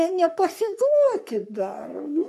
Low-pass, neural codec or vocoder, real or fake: 14.4 kHz; codec, 44.1 kHz, 3.4 kbps, Pupu-Codec; fake